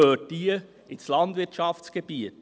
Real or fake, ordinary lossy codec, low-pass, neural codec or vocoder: real; none; none; none